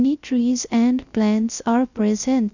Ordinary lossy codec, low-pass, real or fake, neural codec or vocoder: none; 7.2 kHz; fake; codec, 16 kHz, 0.3 kbps, FocalCodec